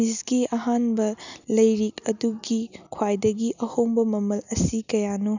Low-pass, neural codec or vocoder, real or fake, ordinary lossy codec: 7.2 kHz; none; real; none